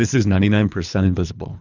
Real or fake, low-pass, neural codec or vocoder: fake; 7.2 kHz; codec, 24 kHz, 3 kbps, HILCodec